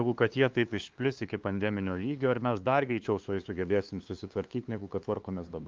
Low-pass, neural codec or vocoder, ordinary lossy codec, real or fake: 7.2 kHz; codec, 16 kHz, 2 kbps, FunCodec, trained on LibriTTS, 25 frames a second; Opus, 24 kbps; fake